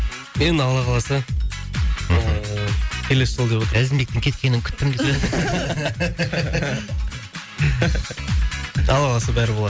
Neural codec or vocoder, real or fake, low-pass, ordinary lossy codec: none; real; none; none